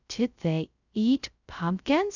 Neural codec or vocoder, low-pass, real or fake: codec, 16 kHz, 0.2 kbps, FocalCodec; 7.2 kHz; fake